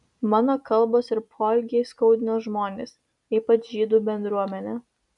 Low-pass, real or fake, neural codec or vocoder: 10.8 kHz; real; none